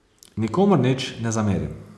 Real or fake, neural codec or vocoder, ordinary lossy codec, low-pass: real; none; none; none